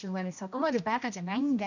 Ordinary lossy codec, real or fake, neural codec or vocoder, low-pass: none; fake; codec, 16 kHz, 1 kbps, X-Codec, HuBERT features, trained on general audio; 7.2 kHz